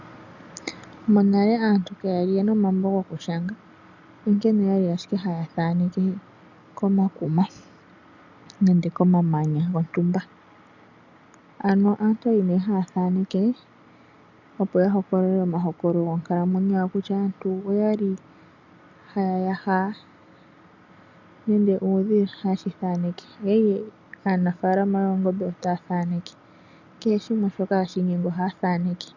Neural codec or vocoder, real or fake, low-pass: none; real; 7.2 kHz